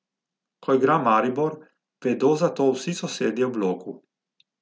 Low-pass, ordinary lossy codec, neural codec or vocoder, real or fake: none; none; none; real